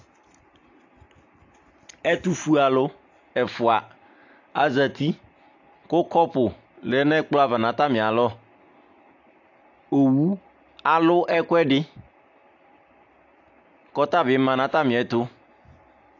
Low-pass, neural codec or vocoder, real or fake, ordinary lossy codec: 7.2 kHz; none; real; AAC, 48 kbps